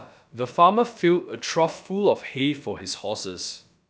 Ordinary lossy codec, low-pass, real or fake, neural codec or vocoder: none; none; fake; codec, 16 kHz, about 1 kbps, DyCAST, with the encoder's durations